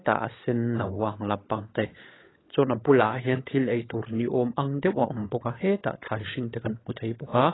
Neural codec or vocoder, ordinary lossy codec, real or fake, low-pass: codec, 16 kHz, 16 kbps, FunCodec, trained on LibriTTS, 50 frames a second; AAC, 16 kbps; fake; 7.2 kHz